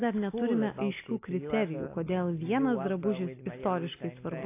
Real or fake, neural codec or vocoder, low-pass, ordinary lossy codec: real; none; 3.6 kHz; AAC, 24 kbps